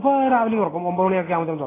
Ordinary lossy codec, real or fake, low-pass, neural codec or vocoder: AAC, 16 kbps; real; 3.6 kHz; none